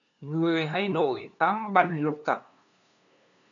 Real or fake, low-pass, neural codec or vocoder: fake; 7.2 kHz; codec, 16 kHz, 2 kbps, FunCodec, trained on LibriTTS, 25 frames a second